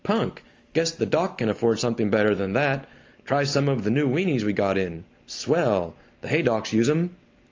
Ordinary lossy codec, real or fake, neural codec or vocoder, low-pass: Opus, 32 kbps; real; none; 7.2 kHz